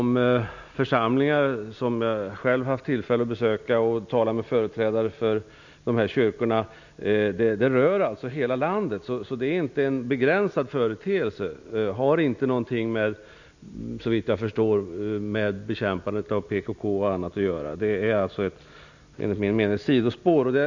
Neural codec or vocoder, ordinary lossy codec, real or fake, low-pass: none; none; real; 7.2 kHz